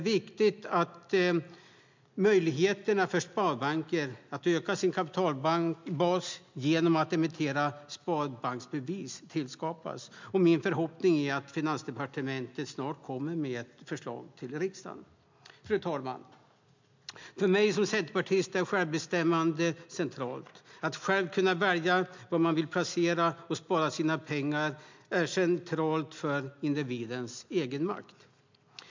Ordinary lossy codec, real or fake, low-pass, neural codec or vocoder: MP3, 64 kbps; real; 7.2 kHz; none